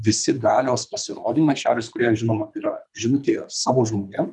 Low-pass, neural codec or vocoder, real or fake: 10.8 kHz; codec, 24 kHz, 3 kbps, HILCodec; fake